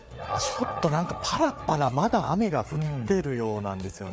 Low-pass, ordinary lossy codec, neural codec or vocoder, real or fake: none; none; codec, 16 kHz, 4 kbps, FreqCodec, larger model; fake